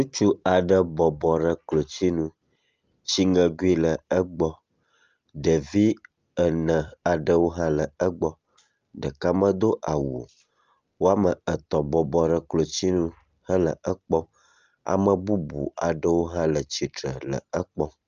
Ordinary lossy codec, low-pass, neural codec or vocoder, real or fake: Opus, 24 kbps; 7.2 kHz; none; real